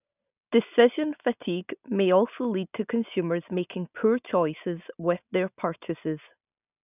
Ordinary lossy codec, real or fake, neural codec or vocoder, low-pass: none; real; none; 3.6 kHz